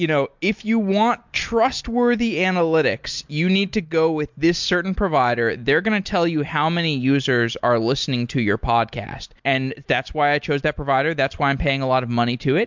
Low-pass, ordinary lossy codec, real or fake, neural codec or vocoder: 7.2 kHz; MP3, 64 kbps; real; none